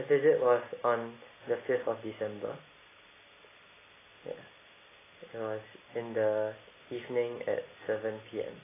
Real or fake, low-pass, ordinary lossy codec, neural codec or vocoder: real; 3.6 kHz; AAC, 16 kbps; none